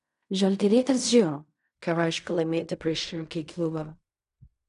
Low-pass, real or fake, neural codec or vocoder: 10.8 kHz; fake; codec, 16 kHz in and 24 kHz out, 0.4 kbps, LongCat-Audio-Codec, fine tuned four codebook decoder